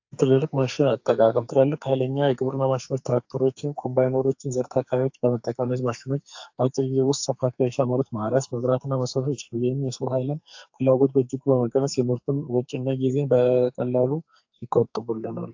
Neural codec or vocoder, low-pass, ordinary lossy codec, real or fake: codec, 44.1 kHz, 2.6 kbps, SNAC; 7.2 kHz; AAC, 48 kbps; fake